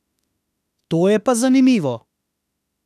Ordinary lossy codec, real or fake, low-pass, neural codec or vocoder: none; fake; 14.4 kHz; autoencoder, 48 kHz, 32 numbers a frame, DAC-VAE, trained on Japanese speech